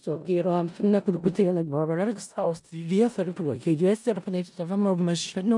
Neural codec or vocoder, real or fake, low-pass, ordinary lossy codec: codec, 16 kHz in and 24 kHz out, 0.4 kbps, LongCat-Audio-Codec, four codebook decoder; fake; 10.8 kHz; MP3, 96 kbps